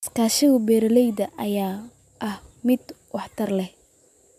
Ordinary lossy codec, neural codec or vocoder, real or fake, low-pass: AAC, 96 kbps; none; real; 14.4 kHz